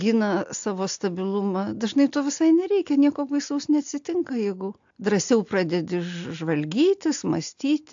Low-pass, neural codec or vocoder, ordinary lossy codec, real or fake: 7.2 kHz; none; AAC, 64 kbps; real